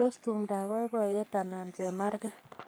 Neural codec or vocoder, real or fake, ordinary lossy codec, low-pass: codec, 44.1 kHz, 3.4 kbps, Pupu-Codec; fake; none; none